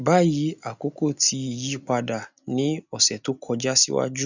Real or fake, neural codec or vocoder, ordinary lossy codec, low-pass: real; none; none; 7.2 kHz